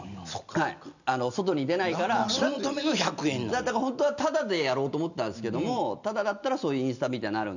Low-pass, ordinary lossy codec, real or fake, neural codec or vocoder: 7.2 kHz; none; real; none